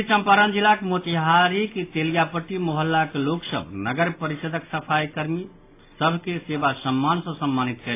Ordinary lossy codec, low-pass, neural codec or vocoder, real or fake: AAC, 24 kbps; 3.6 kHz; none; real